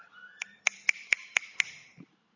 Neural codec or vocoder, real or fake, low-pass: none; real; 7.2 kHz